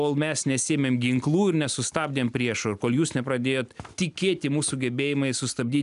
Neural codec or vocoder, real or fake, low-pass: none; real; 10.8 kHz